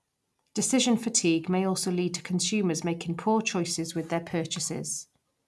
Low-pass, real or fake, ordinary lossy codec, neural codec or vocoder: none; real; none; none